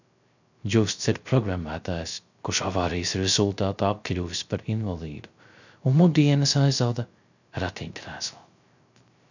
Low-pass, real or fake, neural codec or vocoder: 7.2 kHz; fake; codec, 16 kHz, 0.3 kbps, FocalCodec